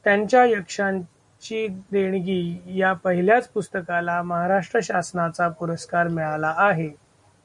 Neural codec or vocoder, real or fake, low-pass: none; real; 10.8 kHz